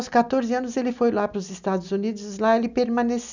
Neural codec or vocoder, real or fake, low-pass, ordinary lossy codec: none; real; 7.2 kHz; none